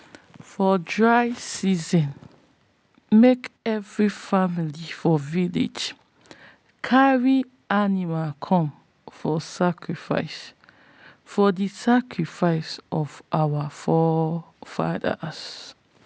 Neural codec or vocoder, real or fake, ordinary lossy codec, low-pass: none; real; none; none